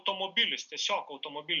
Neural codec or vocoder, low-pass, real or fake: none; 7.2 kHz; real